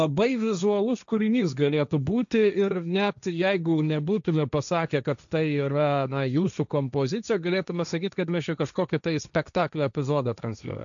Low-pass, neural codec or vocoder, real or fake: 7.2 kHz; codec, 16 kHz, 1.1 kbps, Voila-Tokenizer; fake